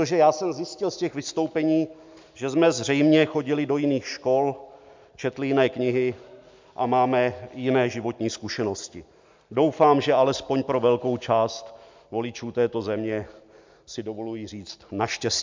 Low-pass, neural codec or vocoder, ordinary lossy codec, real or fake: 7.2 kHz; none; MP3, 64 kbps; real